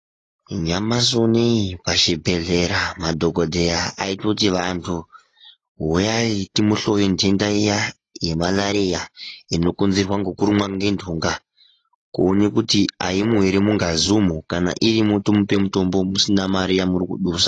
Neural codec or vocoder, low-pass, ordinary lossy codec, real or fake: none; 10.8 kHz; AAC, 32 kbps; real